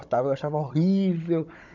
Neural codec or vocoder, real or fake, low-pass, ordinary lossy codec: codec, 16 kHz, 16 kbps, FunCodec, trained on Chinese and English, 50 frames a second; fake; 7.2 kHz; none